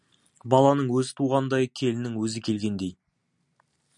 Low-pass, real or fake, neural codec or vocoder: 10.8 kHz; real; none